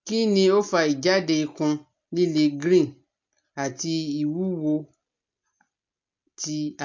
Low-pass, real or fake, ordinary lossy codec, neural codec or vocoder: 7.2 kHz; real; MP3, 48 kbps; none